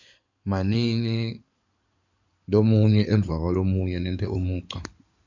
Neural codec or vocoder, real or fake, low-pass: codec, 16 kHz in and 24 kHz out, 2.2 kbps, FireRedTTS-2 codec; fake; 7.2 kHz